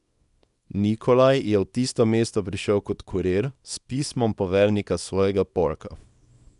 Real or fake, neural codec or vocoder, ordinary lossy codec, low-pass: fake; codec, 24 kHz, 0.9 kbps, WavTokenizer, small release; none; 10.8 kHz